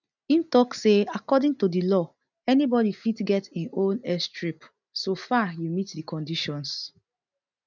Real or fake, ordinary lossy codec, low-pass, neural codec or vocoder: real; none; 7.2 kHz; none